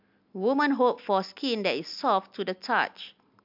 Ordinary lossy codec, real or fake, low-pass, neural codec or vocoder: MP3, 48 kbps; real; 5.4 kHz; none